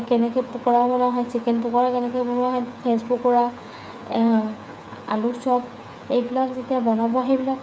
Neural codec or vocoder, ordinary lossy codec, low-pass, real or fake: codec, 16 kHz, 8 kbps, FreqCodec, smaller model; none; none; fake